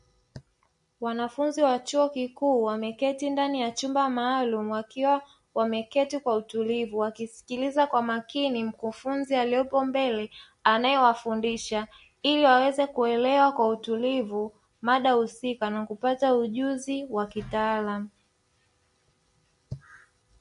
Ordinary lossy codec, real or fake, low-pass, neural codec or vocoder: MP3, 48 kbps; real; 10.8 kHz; none